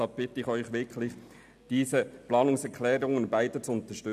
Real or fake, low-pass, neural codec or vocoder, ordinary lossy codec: real; 14.4 kHz; none; none